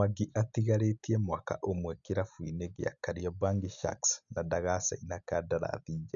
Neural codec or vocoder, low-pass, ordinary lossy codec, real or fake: none; 7.2 kHz; none; real